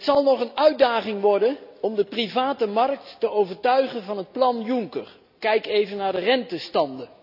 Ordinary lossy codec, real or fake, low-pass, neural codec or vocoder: none; real; 5.4 kHz; none